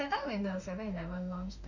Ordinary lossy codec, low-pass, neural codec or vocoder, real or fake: none; 7.2 kHz; autoencoder, 48 kHz, 32 numbers a frame, DAC-VAE, trained on Japanese speech; fake